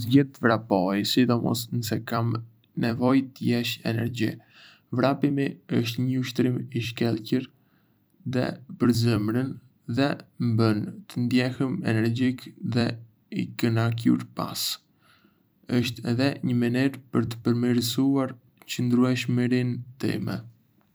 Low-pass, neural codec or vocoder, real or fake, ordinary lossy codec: none; vocoder, 44.1 kHz, 128 mel bands every 256 samples, BigVGAN v2; fake; none